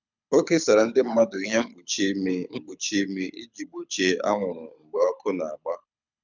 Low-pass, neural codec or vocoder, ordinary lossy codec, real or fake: 7.2 kHz; codec, 24 kHz, 6 kbps, HILCodec; none; fake